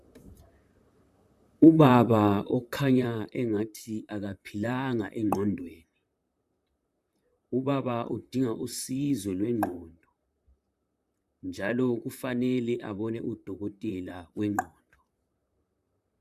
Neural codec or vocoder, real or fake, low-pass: vocoder, 44.1 kHz, 128 mel bands, Pupu-Vocoder; fake; 14.4 kHz